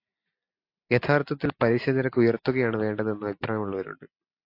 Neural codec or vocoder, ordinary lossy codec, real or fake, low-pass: none; AAC, 32 kbps; real; 5.4 kHz